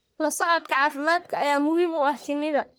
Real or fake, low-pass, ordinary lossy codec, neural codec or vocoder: fake; none; none; codec, 44.1 kHz, 1.7 kbps, Pupu-Codec